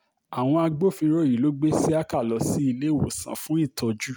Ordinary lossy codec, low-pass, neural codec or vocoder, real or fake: none; none; none; real